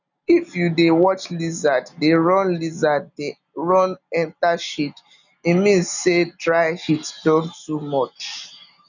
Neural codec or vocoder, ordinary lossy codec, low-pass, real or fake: none; none; 7.2 kHz; real